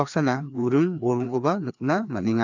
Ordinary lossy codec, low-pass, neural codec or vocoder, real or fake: none; 7.2 kHz; codec, 16 kHz, 2 kbps, FreqCodec, larger model; fake